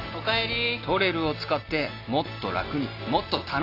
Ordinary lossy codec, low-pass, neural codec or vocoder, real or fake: AAC, 32 kbps; 5.4 kHz; none; real